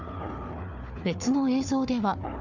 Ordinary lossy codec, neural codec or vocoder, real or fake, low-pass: none; codec, 16 kHz, 4 kbps, FunCodec, trained on Chinese and English, 50 frames a second; fake; 7.2 kHz